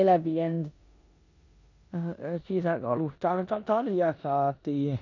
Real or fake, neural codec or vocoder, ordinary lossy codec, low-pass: fake; codec, 16 kHz in and 24 kHz out, 0.9 kbps, LongCat-Audio-Codec, four codebook decoder; none; 7.2 kHz